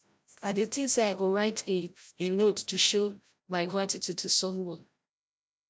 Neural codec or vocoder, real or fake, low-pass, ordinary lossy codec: codec, 16 kHz, 0.5 kbps, FreqCodec, larger model; fake; none; none